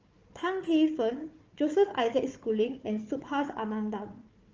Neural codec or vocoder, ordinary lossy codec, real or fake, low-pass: codec, 16 kHz, 4 kbps, FunCodec, trained on Chinese and English, 50 frames a second; Opus, 24 kbps; fake; 7.2 kHz